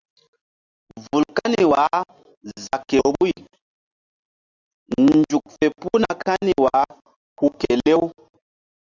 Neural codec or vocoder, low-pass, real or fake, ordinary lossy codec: none; 7.2 kHz; real; Opus, 64 kbps